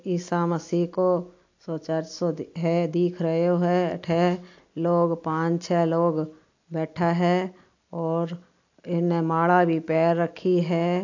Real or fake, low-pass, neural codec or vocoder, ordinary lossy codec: real; 7.2 kHz; none; AAC, 48 kbps